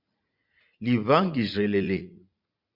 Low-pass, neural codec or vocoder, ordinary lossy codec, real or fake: 5.4 kHz; vocoder, 44.1 kHz, 80 mel bands, Vocos; Opus, 64 kbps; fake